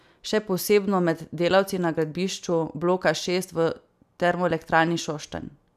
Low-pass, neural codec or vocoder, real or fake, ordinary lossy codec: 14.4 kHz; none; real; none